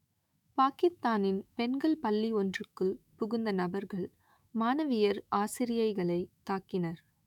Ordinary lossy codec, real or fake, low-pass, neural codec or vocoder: none; fake; 19.8 kHz; autoencoder, 48 kHz, 128 numbers a frame, DAC-VAE, trained on Japanese speech